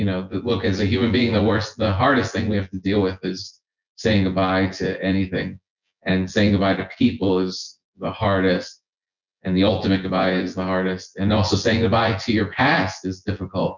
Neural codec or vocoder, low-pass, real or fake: vocoder, 24 kHz, 100 mel bands, Vocos; 7.2 kHz; fake